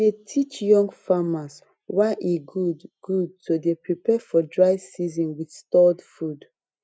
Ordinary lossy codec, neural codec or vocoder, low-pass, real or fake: none; none; none; real